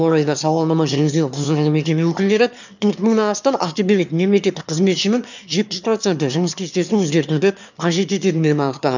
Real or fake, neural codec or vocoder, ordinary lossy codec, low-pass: fake; autoencoder, 22.05 kHz, a latent of 192 numbers a frame, VITS, trained on one speaker; none; 7.2 kHz